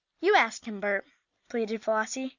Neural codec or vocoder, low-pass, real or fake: none; 7.2 kHz; real